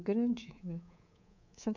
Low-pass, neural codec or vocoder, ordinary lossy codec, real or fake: 7.2 kHz; codec, 24 kHz, 3.1 kbps, DualCodec; none; fake